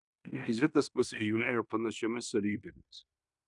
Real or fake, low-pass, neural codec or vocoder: fake; 10.8 kHz; codec, 16 kHz in and 24 kHz out, 0.9 kbps, LongCat-Audio-Codec, fine tuned four codebook decoder